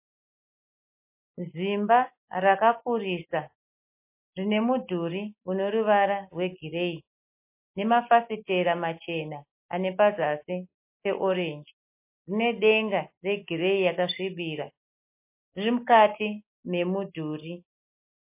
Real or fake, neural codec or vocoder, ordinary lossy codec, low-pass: real; none; MP3, 24 kbps; 3.6 kHz